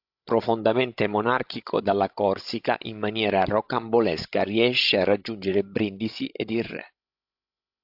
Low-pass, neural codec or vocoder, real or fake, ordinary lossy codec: 5.4 kHz; codec, 16 kHz, 16 kbps, FreqCodec, larger model; fake; AAC, 48 kbps